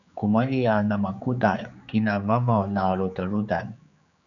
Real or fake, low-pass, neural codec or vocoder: fake; 7.2 kHz; codec, 16 kHz, 4 kbps, X-Codec, HuBERT features, trained on general audio